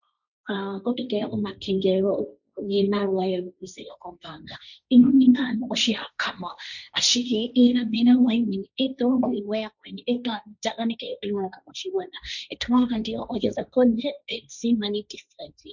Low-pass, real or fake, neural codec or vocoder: 7.2 kHz; fake; codec, 16 kHz, 1.1 kbps, Voila-Tokenizer